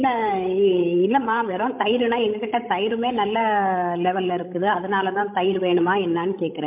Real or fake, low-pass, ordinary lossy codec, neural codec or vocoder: fake; 3.6 kHz; MP3, 32 kbps; codec, 16 kHz, 16 kbps, FreqCodec, larger model